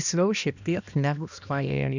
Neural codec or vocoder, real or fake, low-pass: codec, 16 kHz, 1 kbps, X-Codec, HuBERT features, trained on balanced general audio; fake; 7.2 kHz